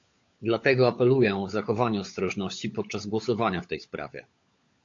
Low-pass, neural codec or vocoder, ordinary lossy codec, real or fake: 7.2 kHz; codec, 16 kHz, 16 kbps, FunCodec, trained on LibriTTS, 50 frames a second; AAC, 48 kbps; fake